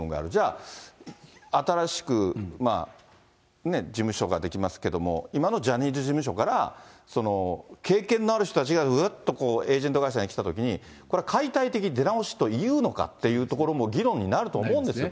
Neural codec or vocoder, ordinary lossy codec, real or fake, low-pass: none; none; real; none